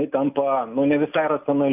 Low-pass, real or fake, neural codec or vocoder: 3.6 kHz; real; none